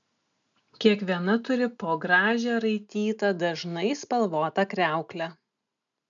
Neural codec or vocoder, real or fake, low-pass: none; real; 7.2 kHz